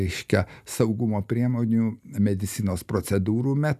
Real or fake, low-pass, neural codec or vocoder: real; 14.4 kHz; none